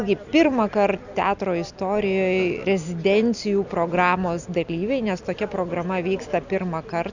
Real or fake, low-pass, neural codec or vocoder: real; 7.2 kHz; none